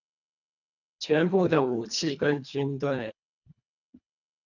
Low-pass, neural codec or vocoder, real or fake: 7.2 kHz; codec, 24 kHz, 1.5 kbps, HILCodec; fake